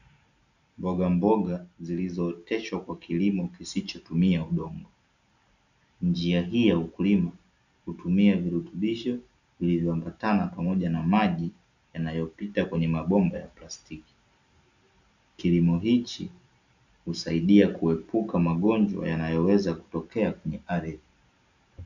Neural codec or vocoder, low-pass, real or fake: none; 7.2 kHz; real